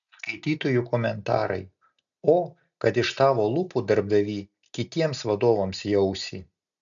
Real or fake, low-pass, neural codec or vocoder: real; 7.2 kHz; none